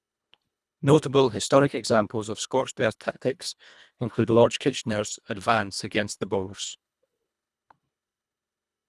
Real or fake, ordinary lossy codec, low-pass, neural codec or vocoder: fake; none; none; codec, 24 kHz, 1.5 kbps, HILCodec